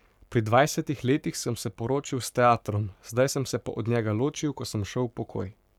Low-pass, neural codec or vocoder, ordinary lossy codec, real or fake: 19.8 kHz; codec, 44.1 kHz, 7.8 kbps, Pupu-Codec; none; fake